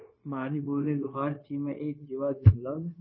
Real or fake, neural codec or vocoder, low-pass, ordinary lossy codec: fake; codec, 16 kHz, 0.9 kbps, LongCat-Audio-Codec; 7.2 kHz; MP3, 24 kbps